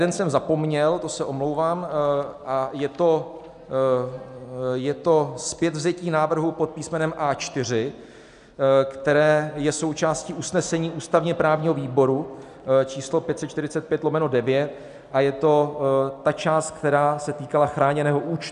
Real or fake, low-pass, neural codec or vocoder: real; 10.8 kHz; none